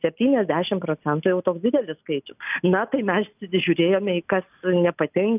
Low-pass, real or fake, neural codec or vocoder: 3.6 kHz; real; none